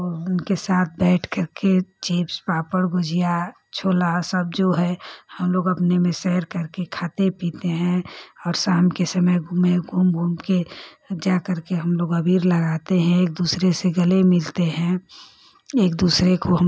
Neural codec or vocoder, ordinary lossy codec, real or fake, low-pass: none; none; real; none